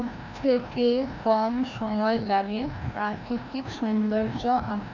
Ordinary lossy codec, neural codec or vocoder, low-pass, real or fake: none; codec, 16 kHz, 1 kbps, FreqCodec, larger model; 7.2 kHz; fake